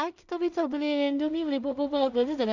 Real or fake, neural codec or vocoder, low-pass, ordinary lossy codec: fake; codec, 16 kHz in and 24 kHz out, 0.4 kbps, LongCat-Audio-Codec, two codebook decoder; 7.2 kHz; none